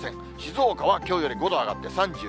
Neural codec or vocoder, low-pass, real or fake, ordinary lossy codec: none; none; real; none